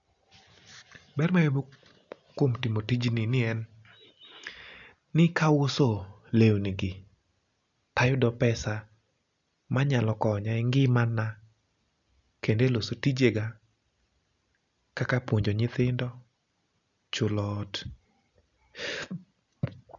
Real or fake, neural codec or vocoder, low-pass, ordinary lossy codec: real; none; 7.2 kHz; none